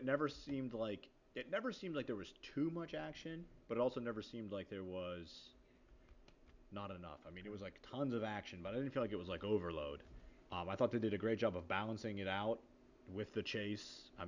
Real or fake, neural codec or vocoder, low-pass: real; none; 7.2 kHz